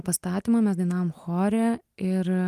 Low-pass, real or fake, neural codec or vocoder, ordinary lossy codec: 14.4 kHz; real; none; Opus, 32 kbps